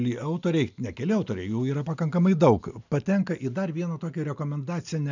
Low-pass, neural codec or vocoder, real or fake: 7.2 kHz; none; real